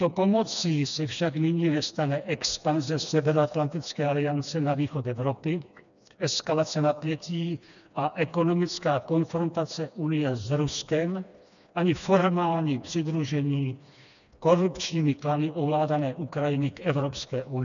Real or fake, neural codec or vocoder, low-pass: fake; codec, 16 kHz, 2 kbps, FreqCodec, smaller model; 7.2 kHz